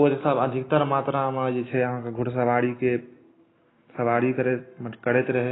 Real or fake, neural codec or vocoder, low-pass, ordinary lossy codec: real; none; 7.2 kHz; AAC, 16 kbps